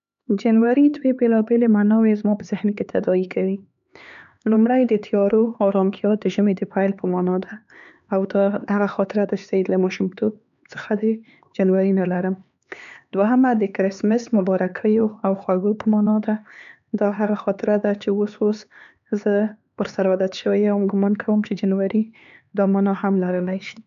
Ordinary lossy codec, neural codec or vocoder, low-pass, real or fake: MP3, 96 kbps; codec, 16 kHz, 4 kbps, X-Codec, HuBERT features, trained on LibriSpeech; 7.2 kHz; fake